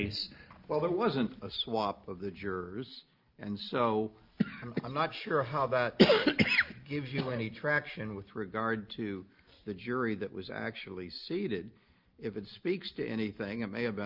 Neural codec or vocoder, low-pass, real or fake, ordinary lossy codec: none; 5.4 kHz; real; Opus, 24 kbps